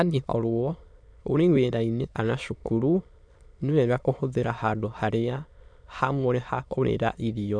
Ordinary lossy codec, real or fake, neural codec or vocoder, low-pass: none; fake; autoencoder, 22.05 kHz, a latent of 192 numbers a frame, VITS, trained on many speakers; 9.9 kHz